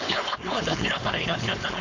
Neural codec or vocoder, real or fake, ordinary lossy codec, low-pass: codec, 16 kHz, 4.8 kbps, FACodec; fake; AAC, 48 kbps; 7.2 kHz